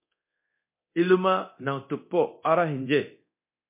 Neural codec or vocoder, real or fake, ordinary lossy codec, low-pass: codec, 24 kHz, 0.9 kbps, DualCodec; fake; MP3, 24 kbps; 3.6 kHz